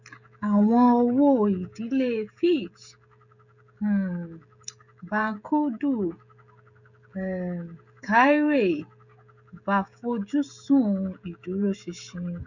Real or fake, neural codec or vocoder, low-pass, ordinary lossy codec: fake; codec, 16 kHz, 16 kbps, FreqCodec, smaller model; 7.2 kHz; none